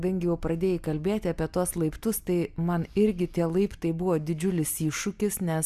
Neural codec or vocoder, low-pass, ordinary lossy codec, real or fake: none; 14.4 kHz; Opus, 64 kbps; real